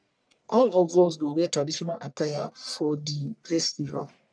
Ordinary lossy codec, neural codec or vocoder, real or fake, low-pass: none; codec, 44.1 kHz, 1.7 kbps, Pupu-Codec; fake; 9.9 kHz